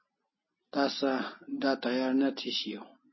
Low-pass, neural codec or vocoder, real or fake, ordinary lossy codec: 7.2 kHz; none; real; MP3, 24 kbps